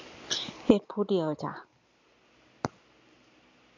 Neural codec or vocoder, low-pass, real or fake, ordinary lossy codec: none; 7.2 kHz; real; MP3, 64 kbps